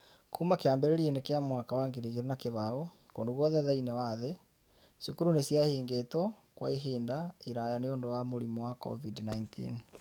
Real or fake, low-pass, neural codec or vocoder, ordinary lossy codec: fake; 19.8 kHz; codec, 44.1 kHz, 7.8 kbps, DAC; none